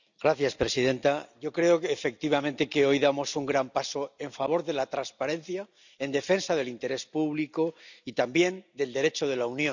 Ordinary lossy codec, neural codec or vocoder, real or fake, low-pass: none; none; real; 7.2 kHz